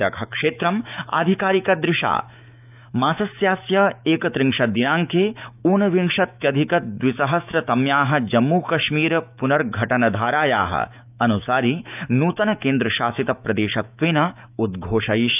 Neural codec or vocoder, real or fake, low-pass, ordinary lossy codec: autoencoder, 48 kHz, 128 numbers a frame, DAC-VAE, trained on Japanese speech; fake; 3.6 kHz; none